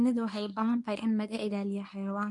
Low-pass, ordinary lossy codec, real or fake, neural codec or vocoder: 10.8 kHz; MP3, 64 kbps; fake; codec, 24 kHz, 0.9 kbps, WavTokenizer, medium speech release version 1